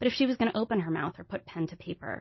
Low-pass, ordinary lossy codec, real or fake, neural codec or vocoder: 7.2 kHz; MP3, 24 kbps; real; none